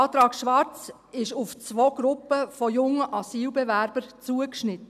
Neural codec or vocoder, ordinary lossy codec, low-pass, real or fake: none; none; 14.4 kHz; real